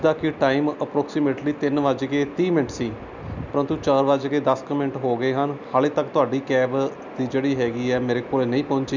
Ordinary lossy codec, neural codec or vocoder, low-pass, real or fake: none; none; 7.2 kHz; real